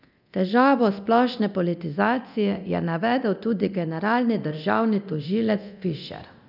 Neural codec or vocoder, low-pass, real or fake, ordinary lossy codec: codec, 24 kHz, 0.9 kbps, DualCodec; 5.4 kHz; fake; none